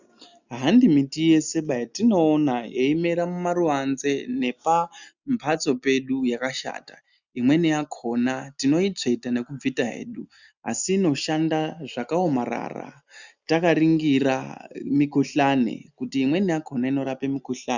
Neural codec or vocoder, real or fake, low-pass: none; real; 7.2 kHz